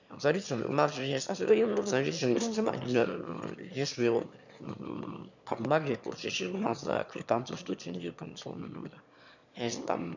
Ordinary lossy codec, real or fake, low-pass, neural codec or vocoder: none; fake; 7.2 kHz; autoencoder, 22.05 kHz, a latent of 192 numbers a frame, VITS, trained on one speaker